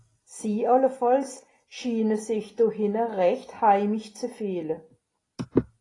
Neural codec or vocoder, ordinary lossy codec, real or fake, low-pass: none; AAC, 32 kbps; real; 10.8 kHz